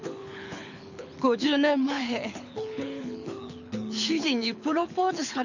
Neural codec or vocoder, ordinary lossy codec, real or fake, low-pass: codec, 24 kHz, 6 kbps, HILCodec; none; fake; 7.2 kHz